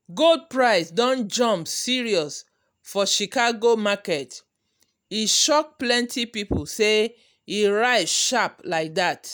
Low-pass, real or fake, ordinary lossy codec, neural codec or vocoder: none; real; none; none